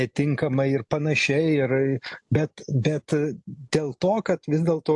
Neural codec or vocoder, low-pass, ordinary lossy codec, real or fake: none; 10.8 kHz; AAC, 64 kbps; real